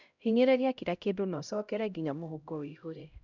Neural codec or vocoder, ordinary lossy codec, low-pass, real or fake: codec, 16 kHz, 0.5 kbps, X-Codec, HuBERT features, trained on LibriSpeech; none; 7.2 kHz; fake